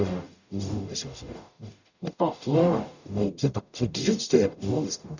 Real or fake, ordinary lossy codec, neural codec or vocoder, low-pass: fake; none; codec, 44.1 kHz, 0.9 kbps, DAC; 7.2 kHz